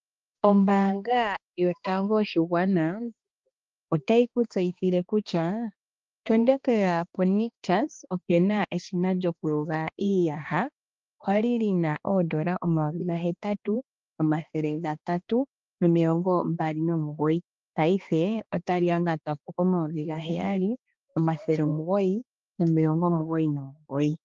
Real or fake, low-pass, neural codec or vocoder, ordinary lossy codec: fake; 7.2 kHz; codec, 16 kHz, 2 kbps, X-Codec, HuBERT features, trained on balanced general audio; Opus, 32 kbps